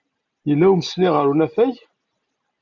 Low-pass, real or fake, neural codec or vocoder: 7.2 kHz; real; none